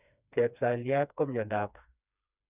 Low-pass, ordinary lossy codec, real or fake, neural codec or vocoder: 3.6 kHz; none; fake; codec, 16 kHz, 2 kbps, FreqCodec, smaller model